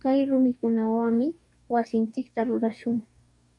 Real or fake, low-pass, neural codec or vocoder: fake; 10.8 kHz; codec, 44.1 kHz, 2.6 kbps, DAC